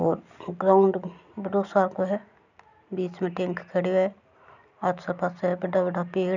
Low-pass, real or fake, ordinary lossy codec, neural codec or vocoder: 7.2 kHz; real; none; none